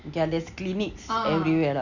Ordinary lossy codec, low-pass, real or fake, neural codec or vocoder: none; 7.2 kHz; real; none